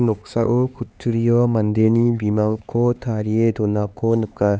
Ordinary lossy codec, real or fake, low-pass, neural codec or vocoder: none; fake; none; codec, 16 kHz, 4 kbps, X-Codec, HuBERT features, trained on LibriSpeech